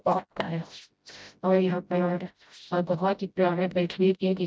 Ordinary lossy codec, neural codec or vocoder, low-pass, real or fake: none; codec, 16 kHz, 0.5 kbps, FreqCodec, smaller model; none; fake